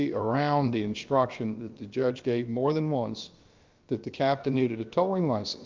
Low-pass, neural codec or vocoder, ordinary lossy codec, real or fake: 7.2 kHz; codec, 16 kHz, about 1 kbps, DyCAST, with the encoder's durations; Opus, 32 kbps; fake